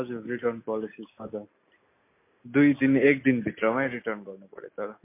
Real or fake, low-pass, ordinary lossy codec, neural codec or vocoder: real; 3.6 kHz; MP3, 24 kbps; none